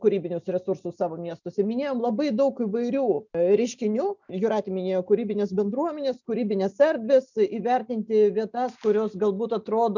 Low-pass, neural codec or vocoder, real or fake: 7.2 kHz; none; real